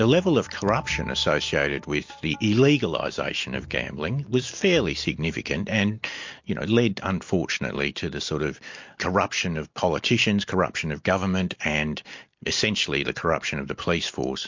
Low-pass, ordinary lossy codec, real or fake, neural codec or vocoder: 7.2 kHz; MP3, 48 kbps; real; none